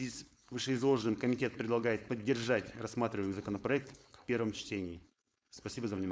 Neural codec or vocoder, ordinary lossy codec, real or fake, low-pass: codec, 16 kHz, 4.8 kbps, FACodec; none; fake; none